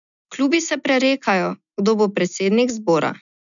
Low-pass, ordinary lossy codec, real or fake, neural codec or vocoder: 7.2 kHz; none; real; none